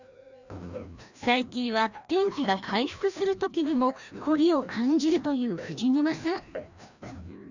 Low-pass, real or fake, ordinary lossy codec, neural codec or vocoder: 7.2 kHz; fake; none; codec, 16 kHz, 1 kbps, FreqCodec, larger model